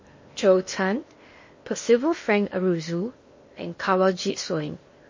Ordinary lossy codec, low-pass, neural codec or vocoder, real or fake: MP3, 32 kbps; 7.2 kHz; codec, 16 kHz in and 24 kHz out, 0.8 kbps, FocalCodec, streaming, 65536 codes; fake